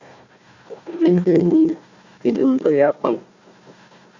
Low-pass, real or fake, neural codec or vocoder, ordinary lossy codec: 7.2 kHz; fake; codec, 16 kHz, 1 kbps, FunCodec, trained on Chinese and English, 50 frames a second; Opus, 64 kbps